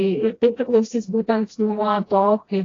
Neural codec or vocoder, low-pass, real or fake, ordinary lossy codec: codec, 16 kHz, 1 kbps, FreqCodec, smaller model; 7.2 kHz; fake; AAC, 32 kbps